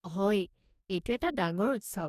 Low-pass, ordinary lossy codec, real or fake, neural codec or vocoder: 14.4 kHz; none; fake; codec, 44.1 kHz, 2.6 kbps, DAC